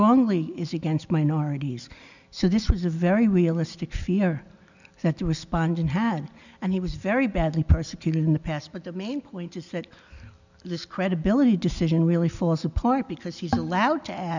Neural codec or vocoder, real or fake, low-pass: none; real; 7.2 kHz